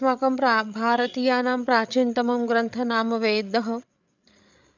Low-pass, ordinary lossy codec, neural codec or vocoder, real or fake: 7.2 kHz; none; codec, 16 kHz, 8 kbps, FreqCodec, larger model; fake